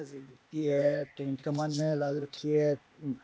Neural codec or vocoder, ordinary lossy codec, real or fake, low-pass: codec, 16 kHz, 0.8 kbps, ZipCodec; none; fake; none